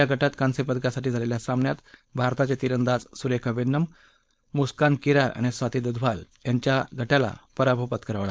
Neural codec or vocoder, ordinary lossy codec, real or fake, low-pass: codec, 16 kHz, 4.8 kbps, FACodec; none; fake; none